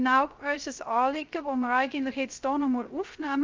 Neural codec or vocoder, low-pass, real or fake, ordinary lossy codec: codec, 16 kHz, 0.3 kbps, FocalCodec; 7.2 kHz; fake; Opus, 32 kbps